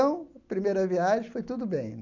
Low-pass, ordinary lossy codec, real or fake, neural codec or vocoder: 7.2 kHz; none; real; none